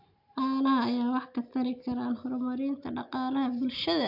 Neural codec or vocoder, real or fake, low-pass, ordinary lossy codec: none; real; 5.4 kHz; AAC, 48 kbps